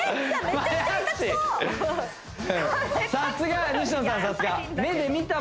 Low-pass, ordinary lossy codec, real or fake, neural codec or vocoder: none; none; real; none